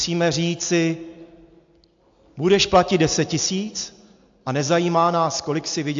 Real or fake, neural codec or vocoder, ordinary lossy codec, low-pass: real; none; AAC, 64 kbps; 7.2 kHz